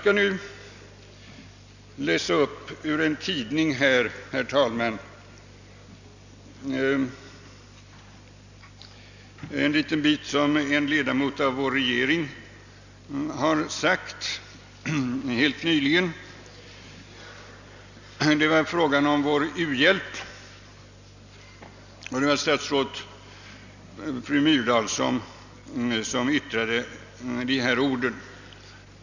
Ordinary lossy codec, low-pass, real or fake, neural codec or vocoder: none; 7.2 kHz; real; none